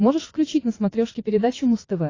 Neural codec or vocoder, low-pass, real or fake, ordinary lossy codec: vocoder, 22.05 kHz, 80 mel bands, WaveNeXt; 7.2 kHz; fake; AAC, 32 kbps